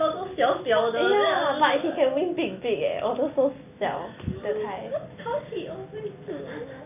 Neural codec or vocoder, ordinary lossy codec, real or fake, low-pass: none; none; real; 3.6 kHz